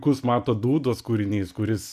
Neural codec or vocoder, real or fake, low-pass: none; real; 14.4 kHz